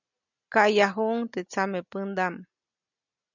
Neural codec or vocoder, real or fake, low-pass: none; real; 7.2 kHz